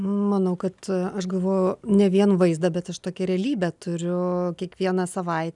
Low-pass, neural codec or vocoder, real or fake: 10.8 kHz; none; real